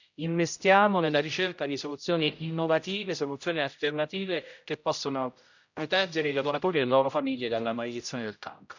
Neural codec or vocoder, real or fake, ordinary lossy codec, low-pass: codec, 16 kHz, 0.5 kbps, X-Codec, HuBERT features, trained on general audio; fake; none; 7.2 kHz